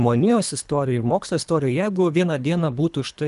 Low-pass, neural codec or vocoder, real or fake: 10.8 kHz; codec, 24 kHz, 3 kbps, HILCodec; fake